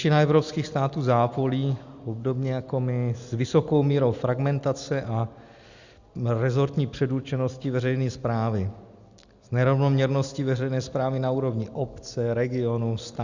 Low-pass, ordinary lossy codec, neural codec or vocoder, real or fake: 7.2 kHz; Opus, 64 kbps; none; real